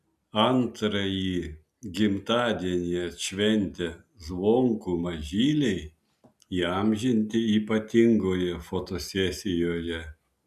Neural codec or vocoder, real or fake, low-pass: none; real; 14.4 kHz